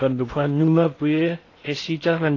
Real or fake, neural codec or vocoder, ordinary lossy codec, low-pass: fake; codec, 16 kHz in and 24 kHz out, 0.6 kbps, FocalCodec, streaming, 2048 codes; AAC, 32 kbps; 7.2 kHz